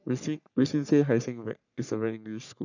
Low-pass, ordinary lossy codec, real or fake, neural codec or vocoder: 7.2 kHz; none; fake; codec, 44.1 kHz, 3.4 kbps, Pupu-Codec